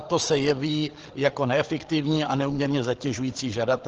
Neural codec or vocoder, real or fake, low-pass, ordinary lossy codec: codec, 16 kHz, 8 kbps, FreqCodec, larger model; fake; 7.2 kHz; Opus, 16 kbps